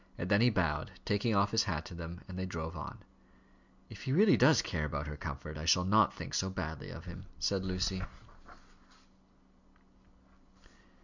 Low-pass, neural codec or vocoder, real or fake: 7.2 kHz; none; real